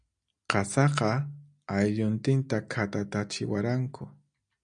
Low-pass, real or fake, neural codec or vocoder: 9.9 kHz; real; none